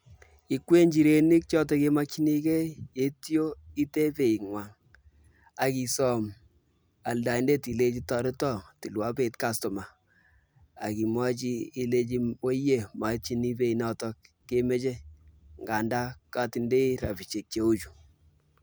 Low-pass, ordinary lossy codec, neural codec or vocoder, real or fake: none; none; none; real